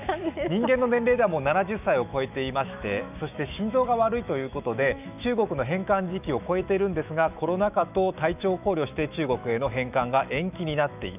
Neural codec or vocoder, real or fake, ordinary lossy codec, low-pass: autoencoder, 48 kHz, 128 numbers a frame, DAC-VAE, trained on Japanese speech; fake; none; 3.6 kHz